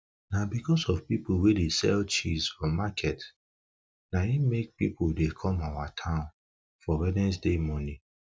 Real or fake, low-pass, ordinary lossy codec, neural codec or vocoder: real; none; none; none